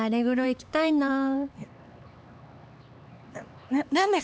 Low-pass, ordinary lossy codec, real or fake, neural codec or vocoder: none; none; fake; codec, 16 kHz, 4 kbps, X-Codec, HuBERT features, trained on LibriSpeech